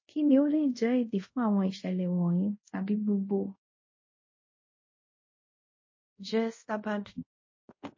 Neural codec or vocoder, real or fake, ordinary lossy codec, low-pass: codec, 24 kHz, 0.5 kbps, DualCodec; fake; MP3, 32 kbps; 7.2 kHz